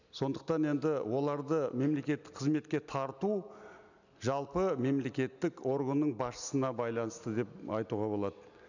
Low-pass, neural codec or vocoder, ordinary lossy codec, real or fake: 7.2 kHz; none; none; real